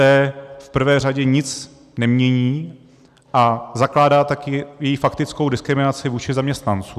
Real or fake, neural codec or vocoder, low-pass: real; none; 14.4 kHz